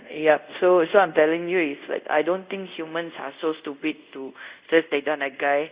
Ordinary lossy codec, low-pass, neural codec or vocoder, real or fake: Opus, 64 kbps; 3.6 kHz; codec, 24 kHz, 0.5 kbps, DualCodec; fake